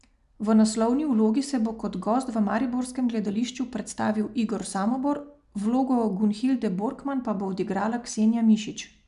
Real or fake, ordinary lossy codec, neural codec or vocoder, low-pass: real; none; none; 10.8 kHz